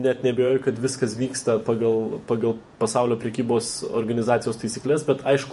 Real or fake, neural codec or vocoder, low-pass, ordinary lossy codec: fake; vocoder, 48 kHz, 128 mel bands, Vocos; 14.4 kHz; MP3, 48 kbps